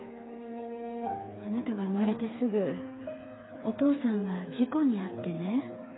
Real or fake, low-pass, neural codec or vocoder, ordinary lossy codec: fake; 7.2 kHz; codec, 16 kHz, 4 kbps, FreqCodec, smaller model; AAC, 16 kbps